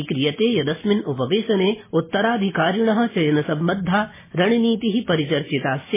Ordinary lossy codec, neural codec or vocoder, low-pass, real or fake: MP3, 16 kbps; none; 3.6 kHz; real